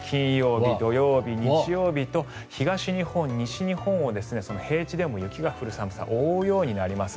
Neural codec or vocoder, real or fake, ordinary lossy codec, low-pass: none; real; none; none